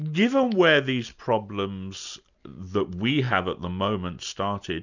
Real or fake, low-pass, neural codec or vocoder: real; 7.2 kHz; none